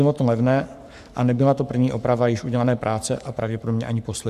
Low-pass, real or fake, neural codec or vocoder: 14.4 kHz; fake; codec, 44.1 kHz, 7.8 kbps, Pupu-Codec